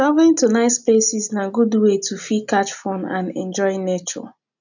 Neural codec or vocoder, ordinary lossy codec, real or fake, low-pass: none; none; real; 7.2 kHz